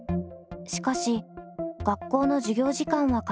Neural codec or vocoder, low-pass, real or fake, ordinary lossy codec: none; none; real; none